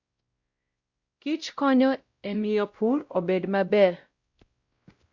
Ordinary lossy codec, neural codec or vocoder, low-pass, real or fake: Opus, 64 kbps; codec, 16 kHz, 0.5 kbps, X-Codec, WavLM features, trained on Multilingual LibriSpeech; 7.2 kHz; fake